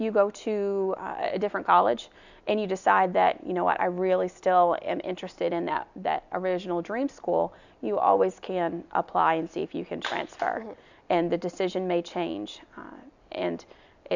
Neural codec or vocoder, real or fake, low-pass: none; real; 7.2 kHz